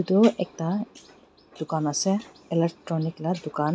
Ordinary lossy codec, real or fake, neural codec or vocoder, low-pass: none; real; none; none